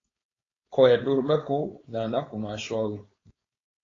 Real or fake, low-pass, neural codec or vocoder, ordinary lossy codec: fake; 7.2 kHz; codec, 16 kHz, 4.8 kbps, FACodec; AAC, 32 kbps